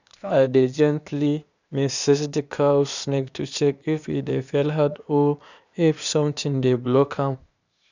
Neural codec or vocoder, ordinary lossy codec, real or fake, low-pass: codec, 16 kHz, 0.8 kbps, ZipCodec; none; fake; 7.2 kHz